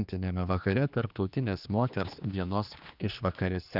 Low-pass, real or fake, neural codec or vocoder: 5.4 kHz; fake; codec, 16 kHz, 2 kbps, X-Codec, HuBERT features, trained on general audio